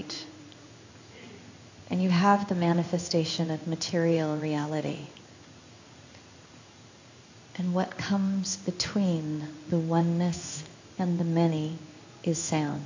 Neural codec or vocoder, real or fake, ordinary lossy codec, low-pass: codec, 16 kHz in and 24 kHz out, 1 kbps, XY-Tokenizer; fake; AAC, 48 kbps; 7.2 kHz